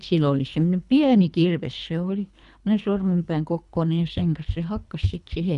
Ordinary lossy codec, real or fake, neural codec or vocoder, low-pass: none; fake; codec, 24 kHz, 3 kbps, HILCodec; 10.8 kHz